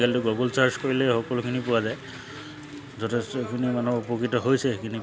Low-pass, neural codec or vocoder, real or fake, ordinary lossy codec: none; none; real; none